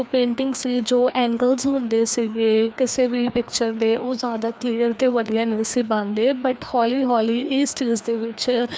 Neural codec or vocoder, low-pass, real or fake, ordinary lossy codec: codec, 16 kHz, 2 kbps, FreqCodec, larger model; none; fake; none